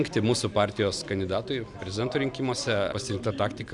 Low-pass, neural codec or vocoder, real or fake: 10.8 kHz; none; real